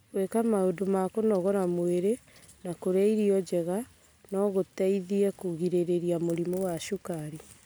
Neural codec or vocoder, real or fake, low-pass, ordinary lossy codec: none; real; none; none